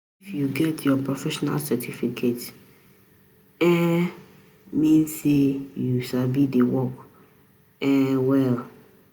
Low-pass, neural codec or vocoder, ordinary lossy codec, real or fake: none; none; none; real